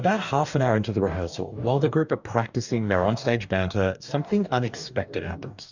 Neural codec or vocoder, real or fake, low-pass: codec, 44.1 kHz, 2.6 kbps, DAC; fake; 7.2 kHz